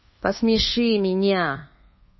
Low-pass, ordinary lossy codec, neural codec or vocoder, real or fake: 7.2 kHz; MP3, 24 kbps; codec, 24 kHz, 1.2 kbps, DualCodec; fake